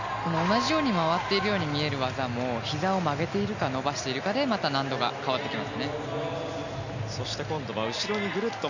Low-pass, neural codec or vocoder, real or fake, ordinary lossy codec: 7.2 kHz; none; real; none